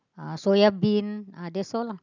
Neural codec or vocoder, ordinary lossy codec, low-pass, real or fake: none; none; 7.2 kHz; real